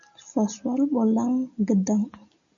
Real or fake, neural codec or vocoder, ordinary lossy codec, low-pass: real; none; MP3, 96 kbps; 7.2 kHz